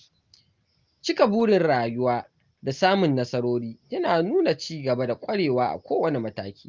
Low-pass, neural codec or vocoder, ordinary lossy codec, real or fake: 7.2 kHz; none; Opus, 32 kbps; real